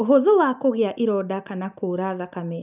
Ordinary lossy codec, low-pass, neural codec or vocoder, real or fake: none; 3.6 kHz; none; real